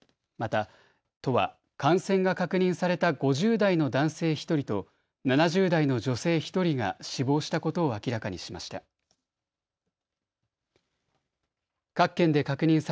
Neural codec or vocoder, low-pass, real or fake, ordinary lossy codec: none; none; real; none